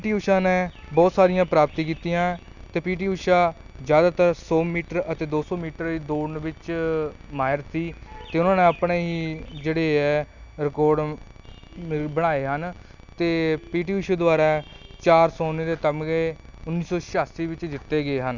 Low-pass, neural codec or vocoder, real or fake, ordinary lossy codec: 7.2 kHz; none; real; none